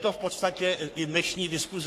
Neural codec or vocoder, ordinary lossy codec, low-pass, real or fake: codec, 44.1 kHz, 3.4 kbps, Pupu-Codec; AAC, 64 kbps; 14.4 kHz; fake